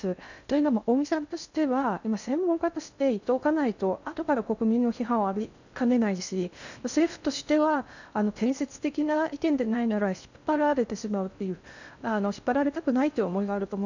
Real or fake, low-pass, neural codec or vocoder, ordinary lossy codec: fake; 7.2 kHz; codec, 16 kHz in and 24 kHz out, 0.6 kbps, FocalCodec, streaming, 2048 codes; none